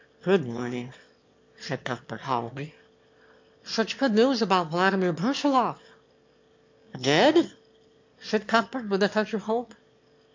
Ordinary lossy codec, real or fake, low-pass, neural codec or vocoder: MP3, 48 kbps; fake; 7.2 kHz; autoencoder, 22.05 kHz, a latent of 192 numbers a frame, VITS, trained on one speaker